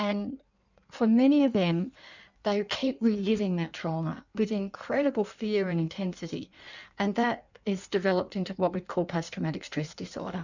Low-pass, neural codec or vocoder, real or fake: 7.2 kHz; codec, 16 kHz in and 24 kHz out, 1.1 kbps, FireRedTTS-2 codec; fake